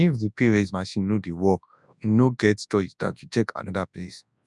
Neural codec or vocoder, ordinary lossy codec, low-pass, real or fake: codec, 24 kHz, 0.9 kbps, WavTokenizer, large speech release; none; 10.8 kHz; fake